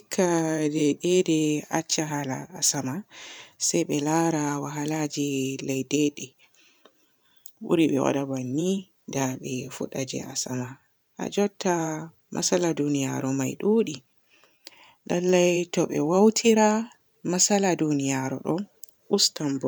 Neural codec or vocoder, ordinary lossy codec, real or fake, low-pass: vocoder, 44.1 kHz, 128 mel bands every 512 samples, BigVGAN v2; none; fake; none